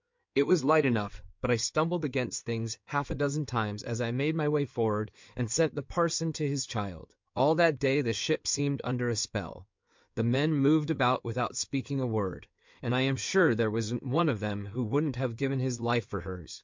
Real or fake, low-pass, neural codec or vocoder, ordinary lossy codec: fake; 7.2 kHz; codec, 16 kHz in and 24 kHz out, 2.2 kbps, FireRedTTS-2 codec; MP3, 64 kbps